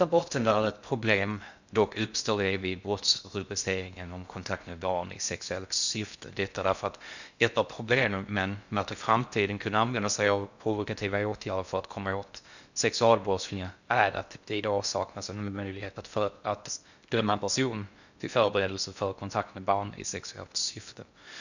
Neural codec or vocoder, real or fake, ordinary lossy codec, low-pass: codec, 16 kHz in and 24 kHz out, 0.6 kbps, FocalCodec, streaming, 2048 codes; fake; none; 7.2 kHz